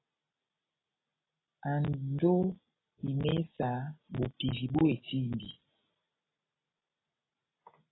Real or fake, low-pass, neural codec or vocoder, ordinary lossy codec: real; 7.2 kHz; none; AAC, 16 kbps